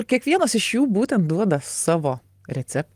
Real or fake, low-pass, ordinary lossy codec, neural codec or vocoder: real; 14.4 kHz; Opus, 32 kbps; none